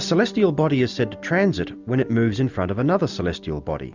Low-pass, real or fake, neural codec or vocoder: 7.2 kHz; real; none